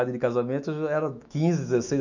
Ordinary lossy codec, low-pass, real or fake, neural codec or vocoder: none; 7.2 kHz; fake; autoencoder, 48 kHz, 128 numbers a frame, DAC-VAE, trained on Japanese speech